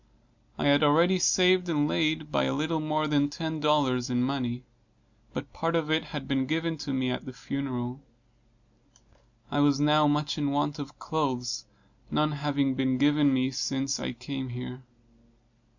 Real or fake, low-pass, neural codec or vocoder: real; 7.2 kHz; none